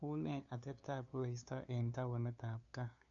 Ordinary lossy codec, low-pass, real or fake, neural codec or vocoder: none; 7.2 kHz; fake; codec, 16 kHz, 2 kbps, FunCodec, trained on LibriTTS, 25 frames a second